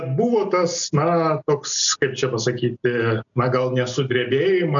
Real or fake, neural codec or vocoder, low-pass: real; none; 7.2 kHz